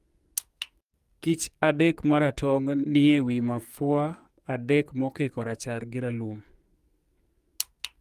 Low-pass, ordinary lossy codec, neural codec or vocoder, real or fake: 14.4 kHz; Opus, 32 kbps; codec, 44.1 kHz, 2.6 kbps, SNAC; fake